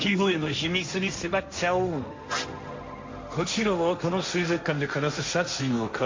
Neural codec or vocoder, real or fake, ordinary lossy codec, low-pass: codec, 16 kHz, 1.1 kbps, Voila-Tokenizer; fake; MP3, 48 kbps; 7.2 kHz